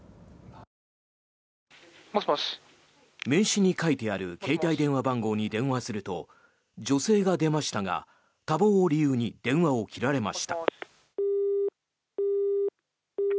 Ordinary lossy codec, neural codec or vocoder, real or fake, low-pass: none; none; real; none